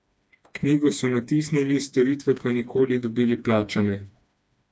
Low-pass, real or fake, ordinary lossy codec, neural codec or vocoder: none; fake; none; codec, 16 kHz, 2 kbps, FreqCodec, smaller model